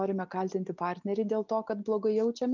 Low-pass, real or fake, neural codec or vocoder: 7.2 kHz; real; none